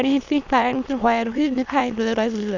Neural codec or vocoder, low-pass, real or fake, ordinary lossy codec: autoencoder, 22.05 kHz, a latent of 192 numbers a frame, VITS, trained on many speakers; 7.2 kHz; fake; none